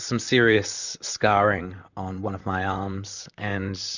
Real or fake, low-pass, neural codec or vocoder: fake; 7.2 kHz; vocoder, 44.1 kHz, 128 mel bands, Pupu-Vocoder